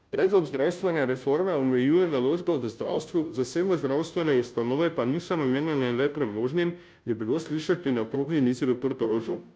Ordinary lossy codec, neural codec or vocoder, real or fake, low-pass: none; codec, 16 kHz, 0.5 kbps, FunCodec, trained on Chinese and English, 25 frames a second; fake; none